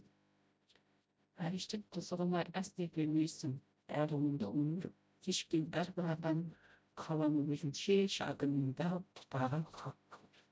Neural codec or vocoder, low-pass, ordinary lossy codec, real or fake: codec, 16 kHz, 0.5 kbps, FreqCodec, smaller model; none; none; fake